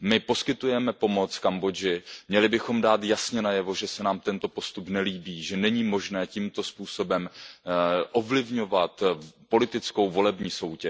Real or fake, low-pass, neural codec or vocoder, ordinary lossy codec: real; none; none; none